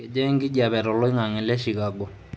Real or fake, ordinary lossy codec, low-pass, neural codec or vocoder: real; none; none; none